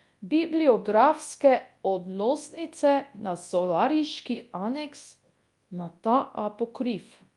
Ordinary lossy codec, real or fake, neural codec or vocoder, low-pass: Opus, 32 kbps; fake; codec, 24 kHz, 0.9 kbps, WavTokenizer, large speech release; 10.8 kHz